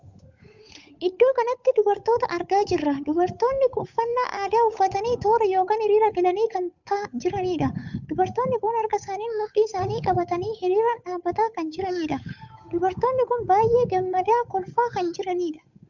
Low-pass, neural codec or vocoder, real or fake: 7.2 kHz; codec, 16 kHz, 8 kbps, FunCodec, trained on Chinese and English, 25 frames a second; fake